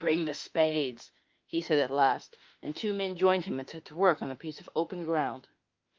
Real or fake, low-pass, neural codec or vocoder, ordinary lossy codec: fake; 7.2 kHz; autoencoder, 48 kHz, 32 numbers a frame, DAC-VAE, trained on Japanese speech; Opus, 32 kbps